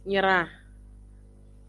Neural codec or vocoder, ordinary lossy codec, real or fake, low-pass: none; Opus, 24 kbps; real; 10.8 kHz